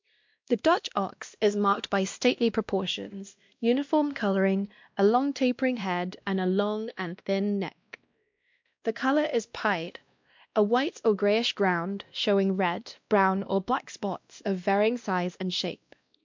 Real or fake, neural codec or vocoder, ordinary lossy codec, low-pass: fake; codec, 16 kHz, 1 kbps, X-Codec, WavLM features, trained on Multilingual LibriSpeech; MP3, 64 kbps; 7.2 kHz